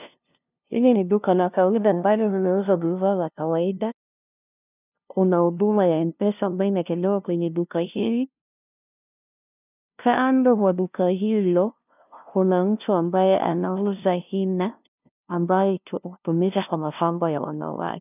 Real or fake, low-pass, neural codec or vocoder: fake; 3.6 kHz; codec, 16 kHz, 0.5 kbps, FunCodec, trained on LibriTTS, 25 frames a second